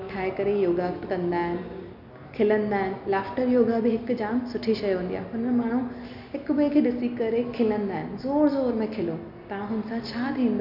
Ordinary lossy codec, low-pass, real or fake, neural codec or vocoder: none; 5.4 kHz; real; none